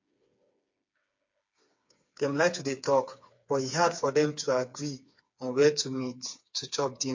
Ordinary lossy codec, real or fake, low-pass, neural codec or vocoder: MP3, 48 kbps; fake; 7.2 kHz; codec, 16 kHz, 4 kbps, FreqCodec, smaller model